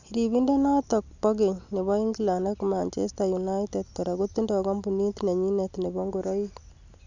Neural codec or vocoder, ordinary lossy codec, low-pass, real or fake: none; none; 7.2 kHz; real